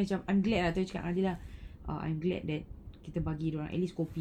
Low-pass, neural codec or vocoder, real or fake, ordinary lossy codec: 19.8 kHz; none; real; none